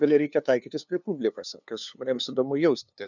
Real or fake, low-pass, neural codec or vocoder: fake; 7.2 kHz; codec, 16 kHz, 2 kbps, FunCodec, trained on LibriTTS, 25 frames a second